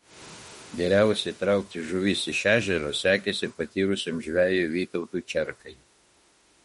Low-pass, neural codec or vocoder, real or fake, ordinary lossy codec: 19.8 kHz; autoencoder, 48 kHz, 32 numbers a frame, DAC-VAE, trained on Japanese speech; fake; MP3, 48 kbps